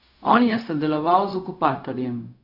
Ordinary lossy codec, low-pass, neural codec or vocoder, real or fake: none; 5.4 kHz; codec, 16 kHz, 0.4 kbps, LongCat-Audio-Codec; fake